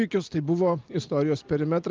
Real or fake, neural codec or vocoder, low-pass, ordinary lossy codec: real; none; 7.2 kHz; Opus, 24 kbps